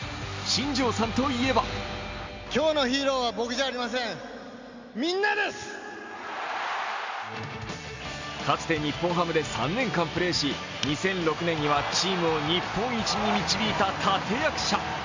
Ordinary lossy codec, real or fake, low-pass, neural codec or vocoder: MP3, 64 kbps; real; 7.2 kHz; none